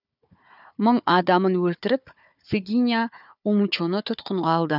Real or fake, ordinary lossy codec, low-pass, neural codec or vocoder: fake; none; 5.4 kHz; codec, 16 kHz, 4 kbps, FunCodec, trained on Chinese and English, 50 frames a second